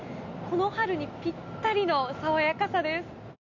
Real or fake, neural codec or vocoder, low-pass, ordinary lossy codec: real; none; 7.2 kHz; none